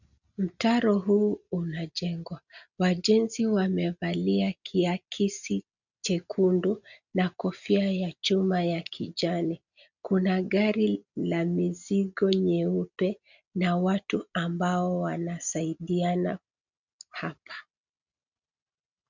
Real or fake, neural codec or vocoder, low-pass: real; none; 7.2 kHz